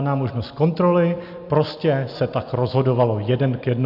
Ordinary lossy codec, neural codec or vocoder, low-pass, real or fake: AAC, 48 kbps; none; 5.4 kHz; real